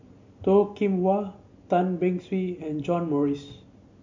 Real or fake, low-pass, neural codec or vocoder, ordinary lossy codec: real; 7.2 kHz; none; MP3, 48 kbps